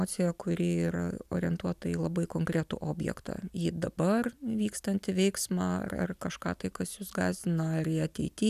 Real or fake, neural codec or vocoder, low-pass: real; none; 14.4 kHz